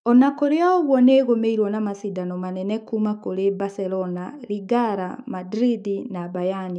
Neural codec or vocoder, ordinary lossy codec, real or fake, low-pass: autoencoder, 48 kHz, 128 numbers a frame, DAC-VAE, trained on Japanese speech; none; fake; 9.9 kHz